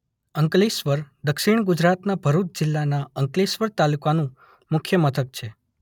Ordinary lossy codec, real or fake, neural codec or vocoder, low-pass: none; real; none; 19.8 kHz